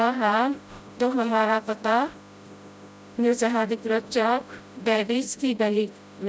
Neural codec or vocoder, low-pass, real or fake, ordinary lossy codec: codec, 16 kHz, 0.5 kbps, FreqCodec, smaller model; none; fake; none